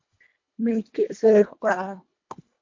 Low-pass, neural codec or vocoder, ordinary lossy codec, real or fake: 7.2 kHz; codec, 24 kHz, 1.5 kbps, HILCodec; MP3, 48 kbps; fake